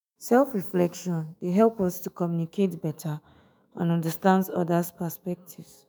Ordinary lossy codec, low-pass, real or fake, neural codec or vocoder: none; none; fake; autoencoder, 48 kHz, 128 numbers a frame, DAC-VAE, trained on Japanese speech